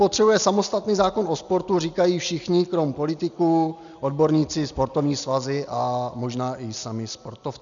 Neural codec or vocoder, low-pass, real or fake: none; 7.2 kHz; real